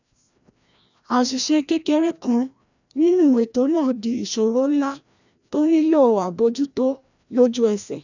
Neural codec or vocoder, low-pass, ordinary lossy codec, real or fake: codec, 16 kHz, 1 kbps, FreqCodec, larger model; 7.2 kHz; none; fake